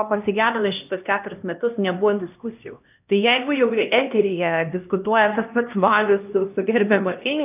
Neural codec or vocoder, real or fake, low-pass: codec, 16 kHz, 1 kbps, X-Codec, HuBERT features, trained on LibriSpeech; fake; 3.6 kHz